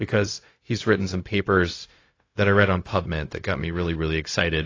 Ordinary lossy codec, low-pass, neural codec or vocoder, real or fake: AAC, 32 kbps; 7.2 kHz; codec, 16 kHz, 0.4 kbps, LongCat-Audio-Codec; fake